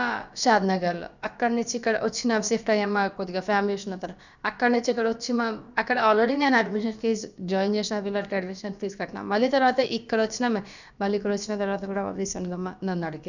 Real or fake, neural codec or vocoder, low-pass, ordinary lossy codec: fake; codec, 16 kHz, about 1 kbps, DyCAST, with the encoder's durations; 7.2 kHz; none